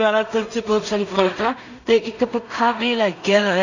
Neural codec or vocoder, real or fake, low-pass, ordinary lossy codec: codec, 16 kHz in and 24 kHz out, 0.4 kbps, LongCat-Audio-Codec, two codebook decoder; fake; 7.2 kHz; none